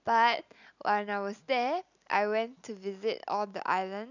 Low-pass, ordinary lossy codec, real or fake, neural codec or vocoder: 7.2 kHz; none; real; none